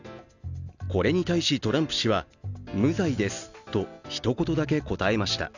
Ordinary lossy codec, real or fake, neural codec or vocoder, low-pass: none; real; none; 7.2 kHz